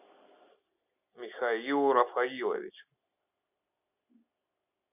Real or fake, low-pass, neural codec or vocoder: real; 3.6 kHz; none